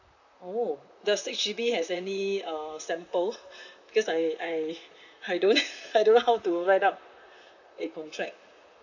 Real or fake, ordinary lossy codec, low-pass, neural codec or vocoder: fake; none; 7.2 kHz; vocoder, 44.1 kHz, 128 mel bands, Pupu-Vocoder